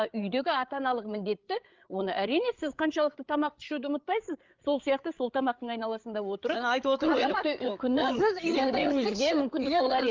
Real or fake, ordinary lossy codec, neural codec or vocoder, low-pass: fake; Opus, 24 kbps; codec, 16 kHz, 8 kbps, FreqCodec, larger model; 7.2 kHz